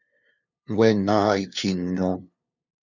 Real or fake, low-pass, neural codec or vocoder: fake; 7.2 kHz; codec, 16 kHz, 2 kbps, FunCodec, trained on LibriTTS, 25 frames a second